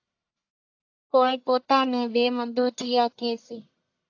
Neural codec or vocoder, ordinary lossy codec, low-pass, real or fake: codec, 44.1 kHz, 1.7 kbps, Pupu-Codec; AAC, 48 kbps; 7.2 kHz; fake